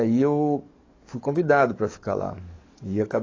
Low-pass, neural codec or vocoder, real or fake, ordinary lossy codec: 7.2 kHz; codec, 44.1 kHz, 7.8 kbps, DAC; fake; AAC, 32 kbps